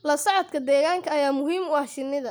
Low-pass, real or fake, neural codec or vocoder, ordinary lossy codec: none; real; none; none